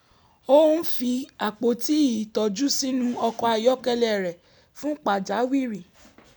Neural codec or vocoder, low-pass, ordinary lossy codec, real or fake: none; none; none; real